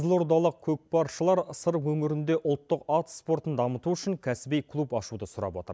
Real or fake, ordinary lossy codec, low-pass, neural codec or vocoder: real; none; none; none